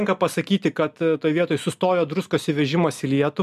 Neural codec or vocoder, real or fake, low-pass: none; real; 14.4 kHz